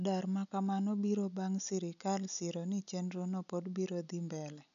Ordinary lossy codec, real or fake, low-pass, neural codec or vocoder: none; real; 7.2 kHz; none